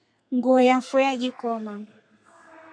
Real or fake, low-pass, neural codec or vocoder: fake; 9.9 kHz; codec, 32 kHz, 1.9 kbps, SNAC